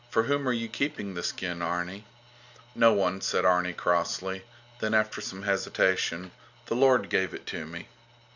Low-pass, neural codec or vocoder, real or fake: 7.2 kHz; none; real